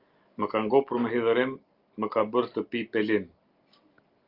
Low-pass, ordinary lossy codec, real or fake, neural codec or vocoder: 5.4 kHz; Opus, 32 kbps; real; none